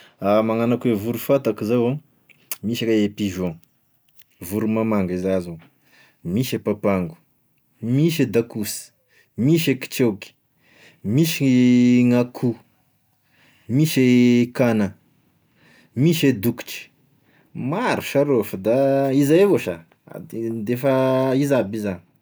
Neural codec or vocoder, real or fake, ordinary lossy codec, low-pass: none; real; none; none